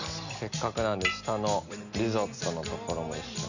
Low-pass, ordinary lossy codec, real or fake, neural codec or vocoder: 7.2 kHz; none; real; none